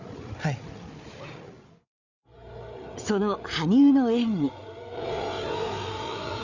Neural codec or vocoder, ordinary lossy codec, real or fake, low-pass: codec, 16 kHz, 8 kbps, FreqCodec, larger model; none; fake; 7.2 kHz